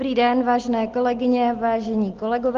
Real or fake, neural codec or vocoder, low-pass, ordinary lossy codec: real; none; 7.2 kHz; Opus, 16 kbps